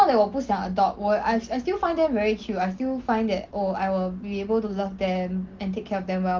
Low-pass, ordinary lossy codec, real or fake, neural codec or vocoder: 7.2 kHz; Opus, 16 kbps; real; none